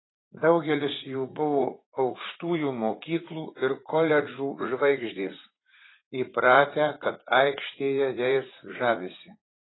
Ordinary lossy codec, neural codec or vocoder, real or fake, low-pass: AAC, 16 kbps; vocoder, 44.1 kHz, 80 mel bands, Vocos; fake; 7.2 kHz